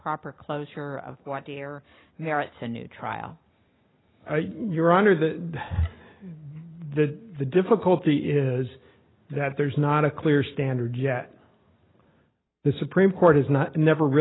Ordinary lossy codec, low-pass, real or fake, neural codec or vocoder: AAC, 16 kbps; 7.2 kHz; real; none